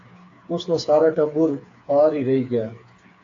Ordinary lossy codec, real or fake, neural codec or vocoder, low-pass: AAC, 48 kbps; fake; codec, 16 kHz, 4 kbps, FreqCodec, smaller model; 7.2 kHz